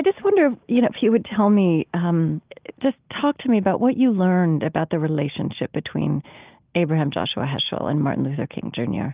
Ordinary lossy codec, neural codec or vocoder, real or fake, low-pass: Opus, 32 kbps; none; real; 3.6 kHz